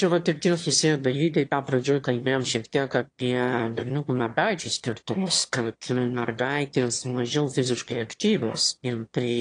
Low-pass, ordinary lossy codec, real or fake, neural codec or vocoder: 9.9 kHz; AAC, 48 kbps; fake; autoencoder, 22.05 kHz, a latent of 192 numbers a frame, VITS, trained on one speaker